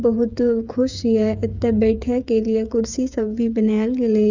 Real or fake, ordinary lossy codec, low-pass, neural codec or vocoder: fake; none; 7.2 kHz; codec, 16 kHz, 8 kbps, FreqCodec, smaller model